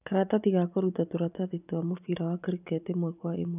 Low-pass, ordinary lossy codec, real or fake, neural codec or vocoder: 3.6 kHz; none; fake; vocoder, 44.1 kHz, 80 mel bands, Vocos